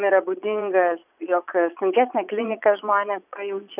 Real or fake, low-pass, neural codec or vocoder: fake; 3.6 kHz; vocoder, 22.05 kHz, 80 mel bands, Vocos